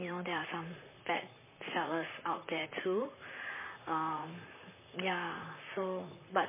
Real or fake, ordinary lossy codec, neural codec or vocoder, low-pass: fake; MP3, 16 kbps; vocoder, 44.1 kHz, 128 mel bands, Pupu-Vocoder; 3.6 kHz